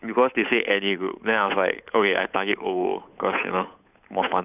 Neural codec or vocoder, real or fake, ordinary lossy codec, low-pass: codec, 16 kHz, 8 kbps, FunCodec, trained on Chinese and English, 25 frames a second; fake; none; 3.6 kHz